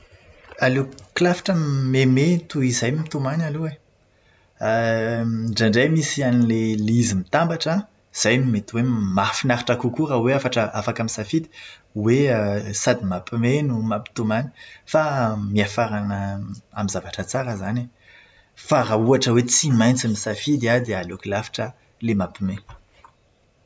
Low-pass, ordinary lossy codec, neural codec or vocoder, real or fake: none; none; none; real